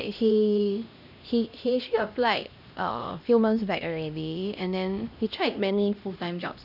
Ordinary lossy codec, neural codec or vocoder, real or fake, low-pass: none; codec, 16 kHz, 1 kbps, X-Codec, HuBERT features, trained on LibriSpeech; fake; 5.4 kHz